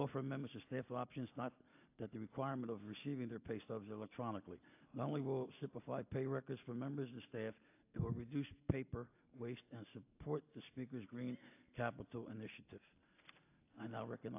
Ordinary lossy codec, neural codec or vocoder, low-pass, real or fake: AAC, 32 kbps; none; 3.6 kHz; real